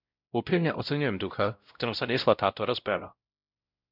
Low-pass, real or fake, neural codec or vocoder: 5.4 kHz; fake; codec, 16 kHz, 0.5 kbps, X-Codec, WavLM features, trained on Multilingual LibriSpeech